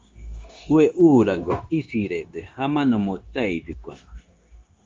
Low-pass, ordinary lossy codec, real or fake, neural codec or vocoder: 7.2 kHz; Opus, 32 kbps; fake; codec, 16 kHz, 0.9 kbps, LongCat-Audio-Codec